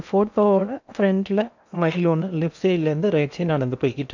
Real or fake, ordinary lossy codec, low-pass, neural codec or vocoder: fake; none; 7.2 kHz; codec, 16 kHz in and 24 kHz out, 0.8 kbps, FocalCodec, streaming, 65536 codes